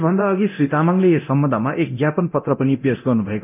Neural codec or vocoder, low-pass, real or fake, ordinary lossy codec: codec, 24 kHz, 0.9 kbps, DualCodec; 3.6 kHz; fake; none